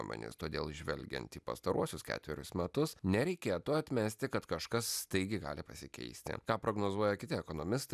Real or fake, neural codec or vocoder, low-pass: real; none; 14.4 kHz